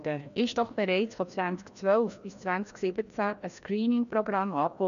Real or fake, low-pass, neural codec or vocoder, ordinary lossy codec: fake; 7.2 kHz; codec, 16 kHz, 1 kbps, FreqCodec, larger model; none